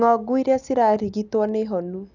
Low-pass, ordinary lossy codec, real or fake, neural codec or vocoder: 7.2 kHz; none; real; none